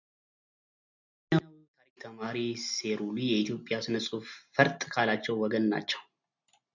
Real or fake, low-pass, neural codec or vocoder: real; 7.2 kHz; none